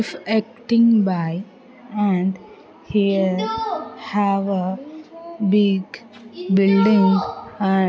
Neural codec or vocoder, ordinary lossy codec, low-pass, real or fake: none; none; none; real